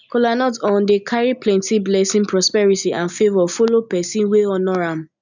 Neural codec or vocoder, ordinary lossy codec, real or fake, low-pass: none; none; real; 7.2 kHz